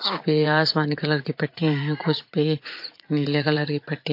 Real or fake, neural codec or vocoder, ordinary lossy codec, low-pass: fake; vocoder, 44.1 kHz, 80 mel bands, Vocos; MP3, 32 kbps; 5.4 kHz